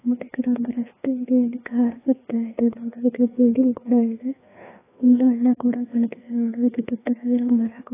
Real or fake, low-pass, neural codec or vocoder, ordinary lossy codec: fake; 3.6 kHz; codec, 16 kHz, 2 kbps, FreqCodec, larger model; AAC, 16 kbps